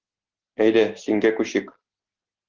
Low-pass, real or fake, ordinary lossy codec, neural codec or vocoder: 7.2 kHz; real; Opus, 16 kbps; none